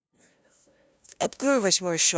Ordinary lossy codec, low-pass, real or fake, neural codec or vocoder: none; none; fake; codec, 16 kHz, 0.5 kbps, FunCodec, trained on LibriTTS, 25 frames a second